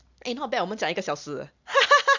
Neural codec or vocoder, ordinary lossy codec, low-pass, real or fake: none; none; 7.2 kHz; real